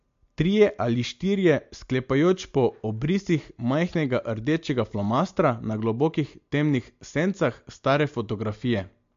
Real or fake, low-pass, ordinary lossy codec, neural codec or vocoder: real; 7.2 kHz; MP3, 48 kbps; none